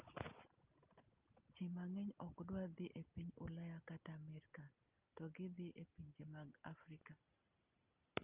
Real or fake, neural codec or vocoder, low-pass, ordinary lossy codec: real; none; 3.6 kHz; Opus, 24 kbps